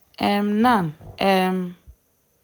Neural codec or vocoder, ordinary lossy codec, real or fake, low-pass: none; none; real; none